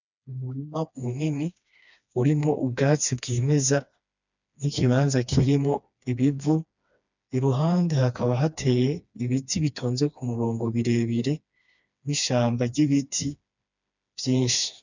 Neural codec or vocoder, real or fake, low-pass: codec, 16 kHz, 2 kbps, FreqCodec, smaller model; fake; 7.2 kHz